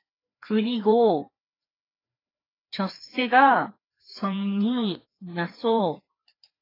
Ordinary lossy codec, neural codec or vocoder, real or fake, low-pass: AAC, 24 kbps; codec, 16 kHz, 2 kbps, FreqCodec, larger model; fake; 5.4 kHz